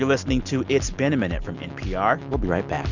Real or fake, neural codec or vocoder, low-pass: real; none; 7.2 kHz